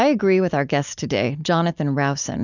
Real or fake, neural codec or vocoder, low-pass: real; none; 7.2 kHz